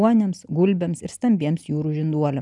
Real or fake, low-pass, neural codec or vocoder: real; 10.8 kHz; none